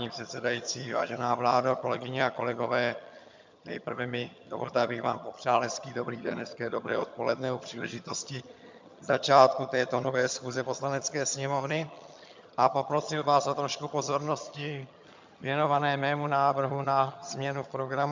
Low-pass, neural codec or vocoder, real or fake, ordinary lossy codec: 7.2 kHz; vocoder, 22.05 kHz, 80 mel bands, HiFi-GAN; fake; MP3, 64 kbps